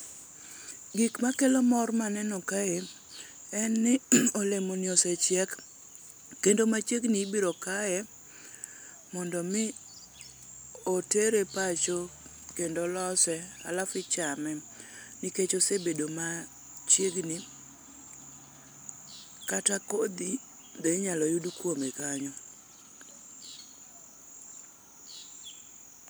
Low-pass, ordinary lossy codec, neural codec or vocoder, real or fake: none; none; none; real